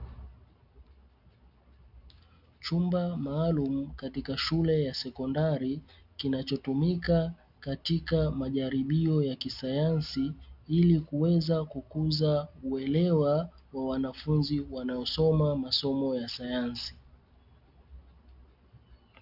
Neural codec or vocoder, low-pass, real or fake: none; 5.4 kHz; real